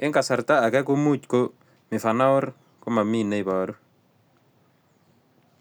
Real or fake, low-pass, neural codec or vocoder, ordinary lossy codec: real; none; none; none